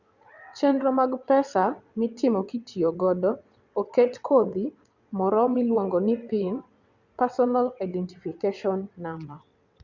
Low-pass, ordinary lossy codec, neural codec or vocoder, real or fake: 7.2 kHz; Opus, 64 kbps; vocoder, 22.05 kHz, 80 mel bands, WaveNeXt; fake